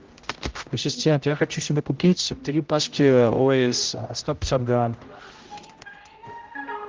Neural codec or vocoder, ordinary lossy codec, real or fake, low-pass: codec, 16 kHz, 0.5 kbps, X-Codec, HuBERT features, trained on general audio; Opus, 24 kbps; fake; 7.2 kHz